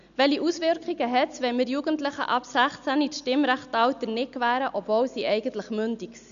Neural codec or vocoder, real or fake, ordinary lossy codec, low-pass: none; real; none; 7.2 kHz